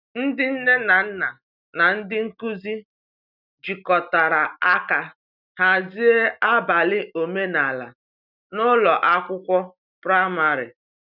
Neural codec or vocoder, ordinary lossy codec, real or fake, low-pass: none; none; real; 5.4 kHz